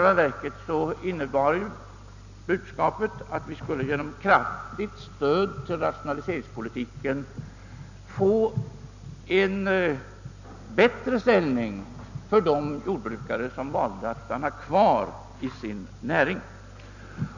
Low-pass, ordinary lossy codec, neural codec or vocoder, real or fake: 7.2 kHz; none; none; real